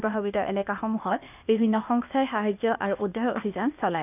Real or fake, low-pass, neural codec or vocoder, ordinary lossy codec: fake; 3.6 kHz; codec, 16 kHz, 0.8 kbps, ZipCodec; none